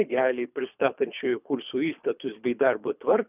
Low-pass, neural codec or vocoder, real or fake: 3.6 kHz; codec, 24 kHz, 3 kbps, HILCodec; fake